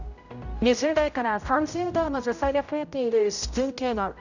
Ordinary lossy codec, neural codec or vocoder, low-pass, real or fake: none; codec, 16 kHz, 0.5 kbps, X-Codec, HuBERT features, trained on general audio; 7.2 kHz; fake